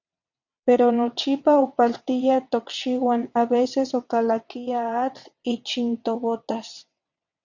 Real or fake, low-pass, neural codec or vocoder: fake; 7.2 kHz; vocoder, 22.05 kHz, 80 mel bands, WaveNeXt